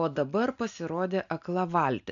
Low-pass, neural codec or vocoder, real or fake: 7.2 kHz; none; real